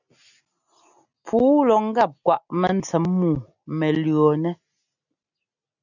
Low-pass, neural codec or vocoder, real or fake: 7.2 kHz; none; real